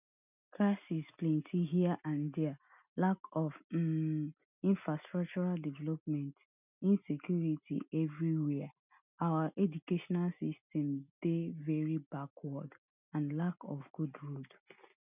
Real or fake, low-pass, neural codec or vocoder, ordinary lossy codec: real; 3.6 kHz; none; none